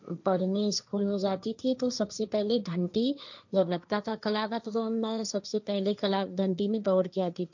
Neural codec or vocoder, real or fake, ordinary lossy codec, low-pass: codec, 16 kHz, 1.1 kbps, Voila-Tokenizer; fake; none; 7.2 kHz